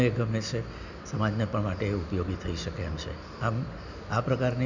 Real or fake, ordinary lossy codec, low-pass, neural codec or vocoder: real; none; 7.2 kHz; none